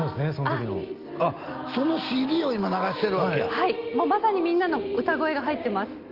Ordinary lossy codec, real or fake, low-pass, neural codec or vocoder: Opus, 32 kbps; real; 5.4 kHz; none